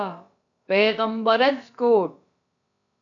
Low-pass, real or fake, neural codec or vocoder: 7.2 kHz; fake; codec, 16 kHz, about 1 kbps, DyCAST, with the encoder's durations